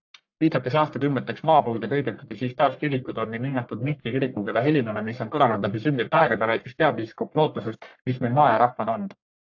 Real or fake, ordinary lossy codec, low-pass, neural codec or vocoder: fake; AAC, 48 kbps; 7.2 kHz; codec, 44.1 kHz, 1.7 kbps, Pupu-Codec